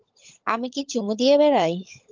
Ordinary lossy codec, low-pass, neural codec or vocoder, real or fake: Opus, 32 kbps; 7.2 kHz; codec, 16 kHz, 16 kbps, FunCodec, trained on LibriTTS, 50 frames a second; fake